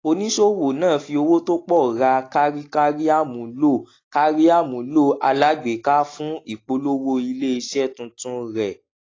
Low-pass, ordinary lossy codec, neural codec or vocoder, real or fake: 7.2 kHz; AAC, 32 kbps; none; real